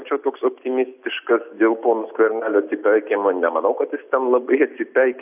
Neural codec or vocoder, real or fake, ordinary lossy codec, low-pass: none; real; AAC, 32 kbps; 3.6 kHz